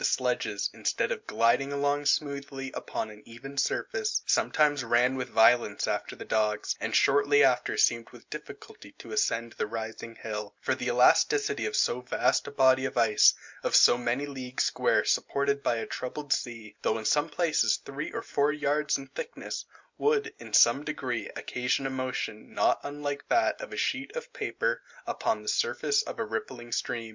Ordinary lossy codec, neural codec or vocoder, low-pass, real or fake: MP3, 64 kbps; none; 7.2 kHz; real